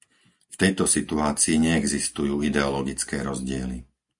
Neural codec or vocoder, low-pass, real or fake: none; 10.8 kHz; real